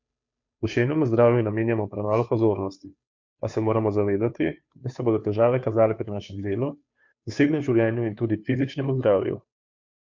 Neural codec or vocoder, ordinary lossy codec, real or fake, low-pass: codec, 16 kHz, 2 kbps, FunCodec, trained on Chinese and English, 25 frames a second; MP3, 48 kbps; fake; 7.2 kHz